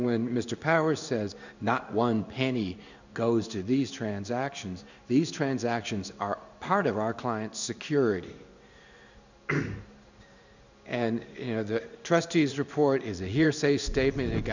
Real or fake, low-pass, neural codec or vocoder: fake; 7.2 kHz; codec, 16 kHz in and 24 kHz out, 1 kbps, XY-Tokenizer